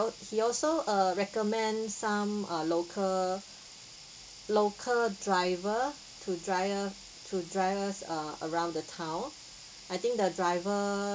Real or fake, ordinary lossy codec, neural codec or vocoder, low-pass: real; none; none; none